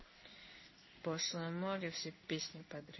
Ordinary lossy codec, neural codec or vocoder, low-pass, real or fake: MP3, 24 kbps; codec, 16 kHz in and 24 kHz out, 1 kbps, XY-Tokenizer; 7.2 kHz; fake